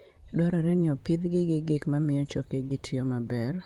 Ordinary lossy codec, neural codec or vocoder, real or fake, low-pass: Opus, 32 kbps; vocoder, 44.1 kHz, 128 mel bands, Pupu-Vocoder; fake; 19.8 kHz